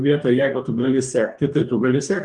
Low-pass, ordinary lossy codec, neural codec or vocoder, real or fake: 10.8 kHz; Opus, 32 kbps; codec, 44.1 kHz, 2.6 kbps, DAC; fake